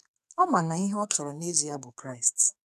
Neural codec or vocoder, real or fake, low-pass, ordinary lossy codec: codec, 44.1 kHz, 2.6 kbps, SNAC; fake; 14.4 kHz; none